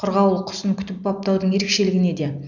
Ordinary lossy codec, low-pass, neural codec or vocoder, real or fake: none; 7.2 kHz; none; real